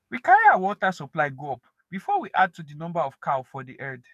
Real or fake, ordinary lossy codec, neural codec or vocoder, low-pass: fake; none; codec, 44.1 kHz, 7.8 kbps, DAC; 14.4 kHz